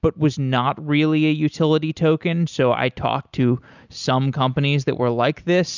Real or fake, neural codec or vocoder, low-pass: real; none; 7.2 kHz